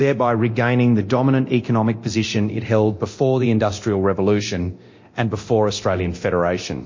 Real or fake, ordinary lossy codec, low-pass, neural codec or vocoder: fake; MP3, 32 kbps; 7.2 kHz; codec, 24 kHz, 0.9 kbps, DualCodec